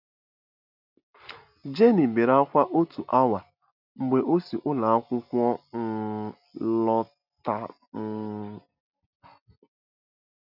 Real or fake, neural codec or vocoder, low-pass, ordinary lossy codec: real; none; 5.4 kHz; none